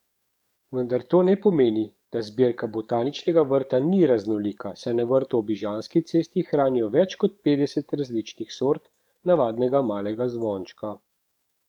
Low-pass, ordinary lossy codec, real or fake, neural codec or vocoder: 19.8 kHz; none; fake; codec, 44.1 kHz, 7.8 kbps, DAC